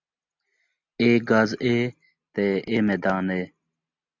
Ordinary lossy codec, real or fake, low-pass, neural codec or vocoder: MP3, 64 kbps; real; 7.2 kHz; none